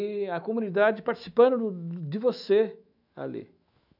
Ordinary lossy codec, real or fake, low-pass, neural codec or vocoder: none; fake; 5.4 kHz; autoencoder, 48 kHz, 128 numbers a frame, DAC-VAE, trained on Japanese speech